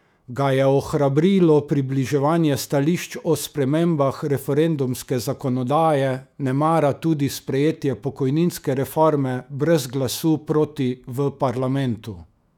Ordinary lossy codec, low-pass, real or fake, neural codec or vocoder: none; 19.8 kHz; fake; autoencoder, 48 kHz, 128 numbers a frame, DAC-VAE, trained on Japanese speech